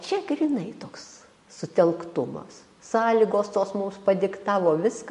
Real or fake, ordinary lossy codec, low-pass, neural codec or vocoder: real; MP3, 48 kbps; 14.4 kHz; none